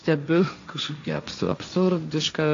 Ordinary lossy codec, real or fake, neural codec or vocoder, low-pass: AAC, 48 kbps; fake; codec, 16 kHz, 1.1 kbps, Voila-Tokenizer; 7.2 kHz